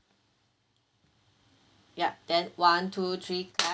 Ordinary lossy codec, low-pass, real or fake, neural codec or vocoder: none; none; real; none